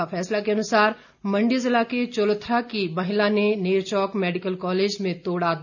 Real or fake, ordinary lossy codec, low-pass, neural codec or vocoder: real; none; 7.2 kHz; none